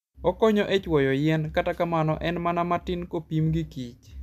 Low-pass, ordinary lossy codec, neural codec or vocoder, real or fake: 14.4 kHz; MP3, 96 kbps; none; real